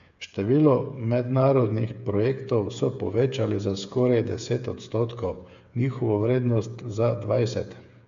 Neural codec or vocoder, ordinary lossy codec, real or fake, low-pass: codec, 16 kHz, 8 kbps, FreqCodec, smaller model; AAC, 96 kbps; fake; 7.2 kHz